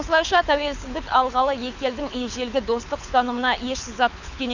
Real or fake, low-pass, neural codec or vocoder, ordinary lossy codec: fake; 7.2 kHz; codec, 24 kHz, 6 kbps, HILCodec; none